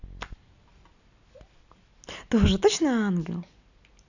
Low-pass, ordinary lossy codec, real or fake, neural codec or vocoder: 7.2 kHz; none; real; none